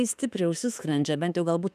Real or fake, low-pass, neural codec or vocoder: fake; 14.4 kHz; autoencoder, 48 kHz, 32 numbers a frame, DAC-VAE, trained on Japanese speech